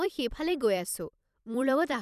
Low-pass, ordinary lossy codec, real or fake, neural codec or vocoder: 14.4 kHz; none; fake; vocoder, 44.1 kHz, 128 mel bands every 256 samples, BigVGAN v2